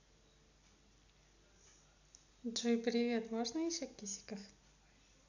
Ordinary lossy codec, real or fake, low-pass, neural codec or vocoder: none; real; 7.2 kHz; none